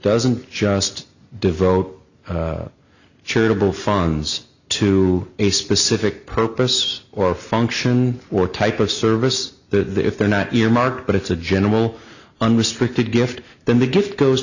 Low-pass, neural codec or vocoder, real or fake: 7.2 kHz; none; real